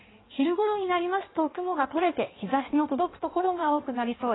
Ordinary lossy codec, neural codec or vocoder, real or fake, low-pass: AAC, 16 kbps; codec, 16 kHz in and 24 kHz out, 1.1 kbps, FireRedTTS-2 codec; fake; 7.2 kHz